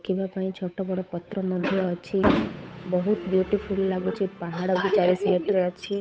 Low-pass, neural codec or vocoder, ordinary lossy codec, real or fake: none; codec, 16 kHz, 8 kbps, FunCodec, trained on Chinese and English, 25 frames a second; none; fake